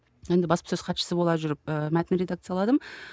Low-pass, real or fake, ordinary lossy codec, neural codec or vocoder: none; real; none; none